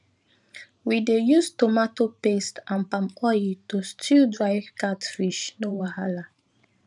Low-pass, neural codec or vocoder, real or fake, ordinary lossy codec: 10.8 kHz; vocoder, 44.1 kHz, 128 mel bands every 512 samples, BigVGAN v2; fake; none